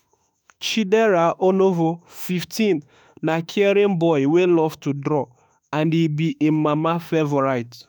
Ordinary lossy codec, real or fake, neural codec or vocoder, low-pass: none; fake; autoencoder, 48 kHz, 32 numbers a frame, DAC-VAE, trained on Japanese speech; none